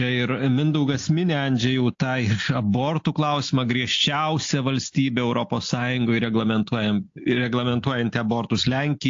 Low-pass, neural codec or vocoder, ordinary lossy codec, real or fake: 7.2 kHz; none; AAC, 48 kbps; real